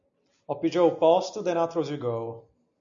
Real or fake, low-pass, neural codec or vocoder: real; 7.2 kHz; none